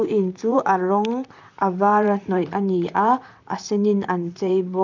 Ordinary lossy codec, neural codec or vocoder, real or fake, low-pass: none; vocoder, 44.1 kHz, 128 mel bands, Pupu-Vocoder; fake; 7.2 kHz